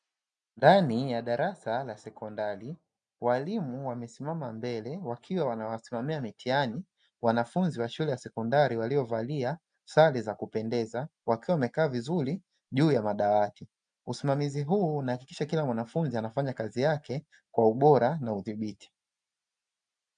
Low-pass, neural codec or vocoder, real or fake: 9.9 kHz; none; real